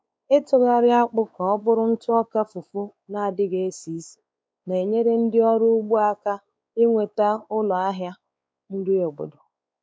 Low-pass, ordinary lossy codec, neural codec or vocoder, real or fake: none; none; codec, 16 kHz, 4 kbps, X-Codec, WavLM features, trained on Multilingual LibriSpeech; fake